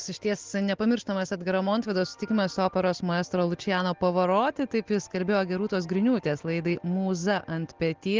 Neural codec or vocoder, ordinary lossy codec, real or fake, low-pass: none; Opus, 16 kbps; real; 7.2 kHz